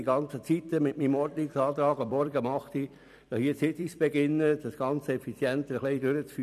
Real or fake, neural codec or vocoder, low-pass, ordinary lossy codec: fake; vocoder, 44.1 kHz, 128 mel bands every 256 samples, BigVGAN v2; 14.4 kHz; none